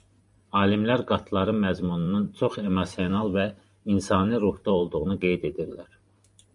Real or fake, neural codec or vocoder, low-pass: fake; vocoder, 44.1 kHz, 128 mel bands every 512 samples, BigVGAN v2; 10.8 kHz